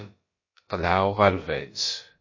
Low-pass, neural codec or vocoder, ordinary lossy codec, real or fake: 7.2 kHz; codec, 16 kHz, about 1 kbps, DyCAST, with the encoder's durations; MP3, 32 kbps; fake